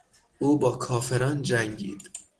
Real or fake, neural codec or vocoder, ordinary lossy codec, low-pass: real; none; Opus, 16 kbps; 10.8 kHz